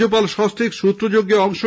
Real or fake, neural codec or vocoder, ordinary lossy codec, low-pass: real; none; none; none